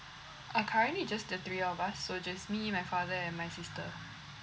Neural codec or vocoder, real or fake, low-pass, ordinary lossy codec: none; real; none; none